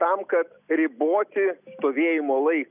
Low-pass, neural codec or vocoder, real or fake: 3.6 kHz; none; real